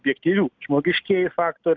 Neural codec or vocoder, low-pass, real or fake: none; 7.2 kHz; real